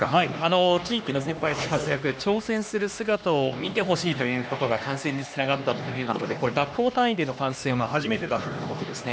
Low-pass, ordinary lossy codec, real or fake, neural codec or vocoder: none; none; fake; codec, 16 kHz, 2 kbps, X-Codec, HuBERT features, trained on LibriSpeech